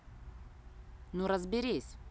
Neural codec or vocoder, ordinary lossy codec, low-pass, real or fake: none; none; none; real